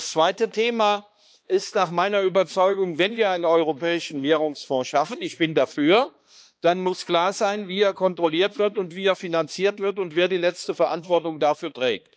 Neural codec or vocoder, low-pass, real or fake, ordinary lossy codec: codec, 16 kHz, 2 kbps, X-Codec, HuBERT features, trained on balanced general audio; none; fake; none